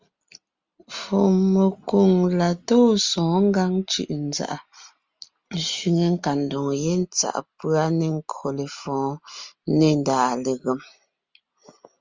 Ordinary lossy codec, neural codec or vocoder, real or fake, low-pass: Opus, 32 kbps; none; real; 7.2 kHz